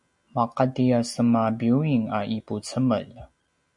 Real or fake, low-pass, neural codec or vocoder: real; 10.8 kHz; none